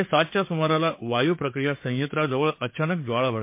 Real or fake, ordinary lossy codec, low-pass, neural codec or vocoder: fake; MP3, 24 kbps; 3.6 kHz; codec, 16 kHz, 4 kbps, FreqCodec, larger model